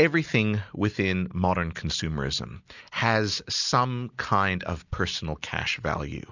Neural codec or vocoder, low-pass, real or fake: none; 7.2 kHz; real